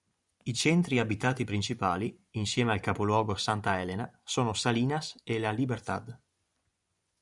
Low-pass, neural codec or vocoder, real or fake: 10.8 kHz; vocoder, 24 kHz, 100 mel bands, Vocos; fake